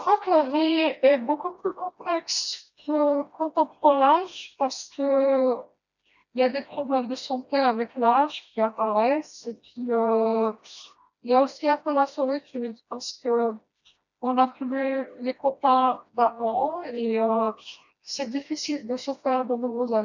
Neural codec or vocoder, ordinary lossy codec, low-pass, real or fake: codec, 16 kHz, 1 kbps, FreqCodec, smaller model; none; 7.2 kHz; fake